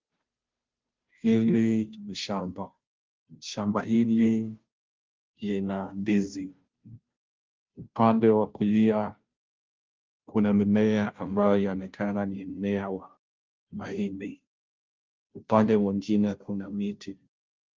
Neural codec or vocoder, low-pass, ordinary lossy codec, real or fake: codec, 16 kHz, 0.5 kbps, FunCodec, trained on Chinese and English, 25 frames a second; 7.2 kHz; Opus, 32 kbps; fake